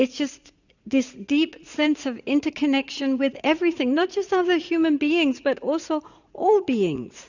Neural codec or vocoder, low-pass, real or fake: vocoder, 44.1 kHz, 80 mel bands, Vocos; 7.2 kHz; fake